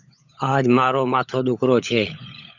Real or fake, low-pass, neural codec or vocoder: fake; 7.2 kHz; codec, 16 kHz, 16 kbps, FunCodec, trained on LibriTTS, 50 frames a second